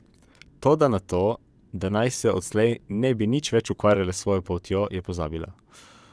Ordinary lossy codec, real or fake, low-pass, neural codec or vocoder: none; fake; none; vocoder, 22.05 kHz, 80 mel bands, WaveNeXt